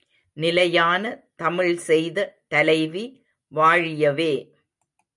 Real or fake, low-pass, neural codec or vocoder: real; 10.8 kHz; none